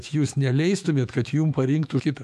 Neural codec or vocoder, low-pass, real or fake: autoencoder, 48 kHz, 128 numbers a frame, DAC-VAE, trained on Japanese speech; 14.4 kHz; fake